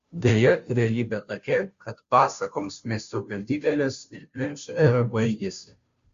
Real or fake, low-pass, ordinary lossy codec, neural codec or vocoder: fake; 7.2 kHz; Opus, 64 kbps; codec, 16 kHz, 0.5 kbps, FunCodec, trained on Chinese and English, 25 frames a second